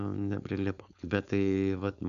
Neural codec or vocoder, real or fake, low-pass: codec, 16 kHz, 4.8 kbps, FACodec; fake; 7.2 kHz